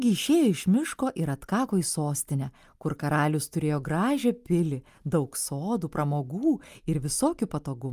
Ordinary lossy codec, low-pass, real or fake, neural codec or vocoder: Opus, 32 kbps; 14.4 kHz; real; none